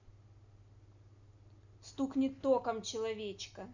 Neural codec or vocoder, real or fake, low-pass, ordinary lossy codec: none; real; 7.2 kHz; Opus, 64 kbps